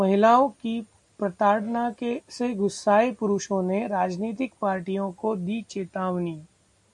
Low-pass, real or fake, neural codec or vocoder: 10.8 kHz; real; none